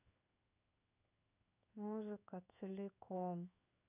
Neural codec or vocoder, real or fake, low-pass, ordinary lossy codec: codec, 16 kHz in and 24 kHz out, 1 kbps, XY-Tokenizer; fake; 3.6 kHz; none